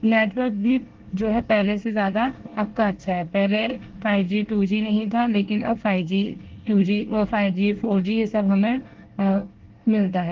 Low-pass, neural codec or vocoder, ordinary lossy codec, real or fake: 7.2 kHz; codec, 24 kHz, 1 kbps, SNAC; Opus, 16 kbps; fake